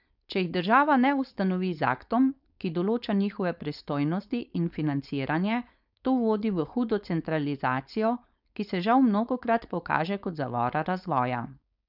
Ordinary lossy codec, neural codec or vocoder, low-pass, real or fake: none; codec, 16 kHz, 4.8 kbps, FACodec; 5.4 kHz; fake